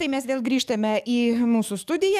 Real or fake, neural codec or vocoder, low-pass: fake; codec, 44.1 kHz, 7.8 kbps, DAC; 14.4 kHz